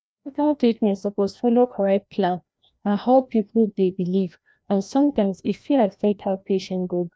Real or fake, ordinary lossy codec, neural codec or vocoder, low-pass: fake; none; codec, 16 kHz, 1 kbps, FreqCodec, larger model; none